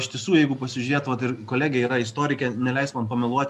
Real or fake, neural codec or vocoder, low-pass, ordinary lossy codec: real; none; 14.4 kHz; MP3, 64 kbps